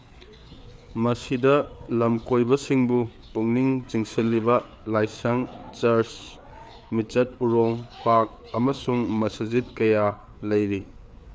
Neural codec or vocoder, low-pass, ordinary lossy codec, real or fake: codec, 16 kHz, 4 kbps, FreqCodec, larger model; none; none; fake